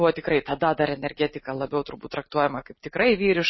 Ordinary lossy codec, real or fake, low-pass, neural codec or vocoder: MP3, 24 kbps; real; 7.2 kHz; none